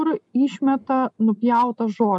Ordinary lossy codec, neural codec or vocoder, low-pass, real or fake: MP3, 96 kbps; none; 10.8 kHz; real